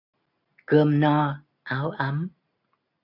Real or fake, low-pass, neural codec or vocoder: real; 5.4 kHz; none